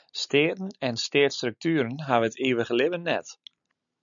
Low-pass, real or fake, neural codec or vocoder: 7.2 kHz; real; none